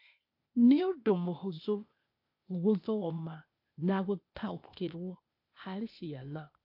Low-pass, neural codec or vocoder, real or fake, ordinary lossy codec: 5.4 kHz; codec, 16 kHz, 0.8 kbps, ZipCodec; fake; AAC, 48 kbps